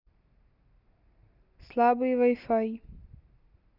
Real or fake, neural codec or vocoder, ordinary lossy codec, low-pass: real; none; none; 5.4 kHz